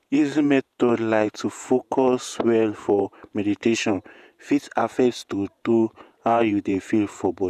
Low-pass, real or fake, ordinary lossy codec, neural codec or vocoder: 14.4 kHz; fake; none; vocoder, 48 kHz, 128 mel bands, Vocos